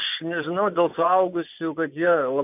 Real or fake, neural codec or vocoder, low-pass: fake; vocoder, 24 kHz, 100 mel bands, Vocos; 3.6 kHz